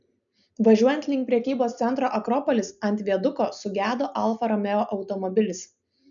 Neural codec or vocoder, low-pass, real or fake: none; 7.2 kHz; real